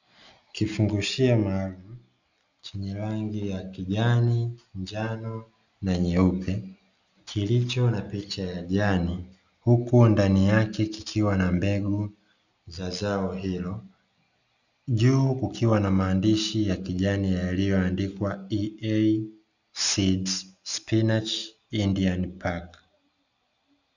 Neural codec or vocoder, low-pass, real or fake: none; 7.2 kHz; real